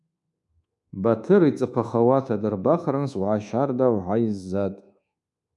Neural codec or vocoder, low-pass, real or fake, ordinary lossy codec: codec, 24 kHz, 1.2 kbps, DualCodec; 10.8 kHz; fake; MP3, 96 kbps